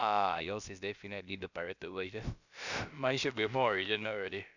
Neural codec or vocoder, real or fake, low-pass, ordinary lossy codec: codec, 16 kHz, about 1 kbps, DyCAST, with the encoder's durations; fake; 7.2 kHz; none